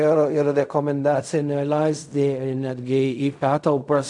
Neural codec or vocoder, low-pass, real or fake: codec, 16 kHz in and 24 kHz out, 0.4 kbps, LongCat-Audio-Codec, fine tuned four codebook decoder; 10.8 kHz; fake